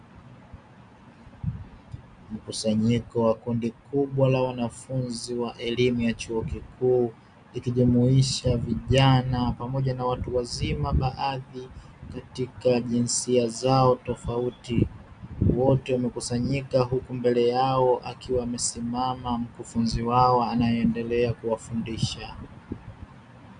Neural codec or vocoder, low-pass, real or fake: none; 9.9 kHz; real